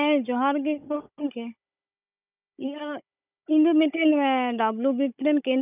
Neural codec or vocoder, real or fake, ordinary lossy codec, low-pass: codec, 16 kHz, 16 kbps, FunCodec, trained on Chinese and English, 50 frames a second; fake; none; 3.6 kHz